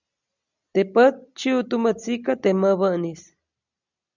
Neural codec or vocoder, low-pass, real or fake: none; 7.2 kHz; real